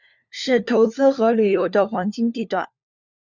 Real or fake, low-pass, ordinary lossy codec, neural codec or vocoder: fake; 7.2 kHz; Opus, 64 kbps; codec, 16 kHz, 4 kbps, FunCodec, trained on LibriTTS, 50 frames a second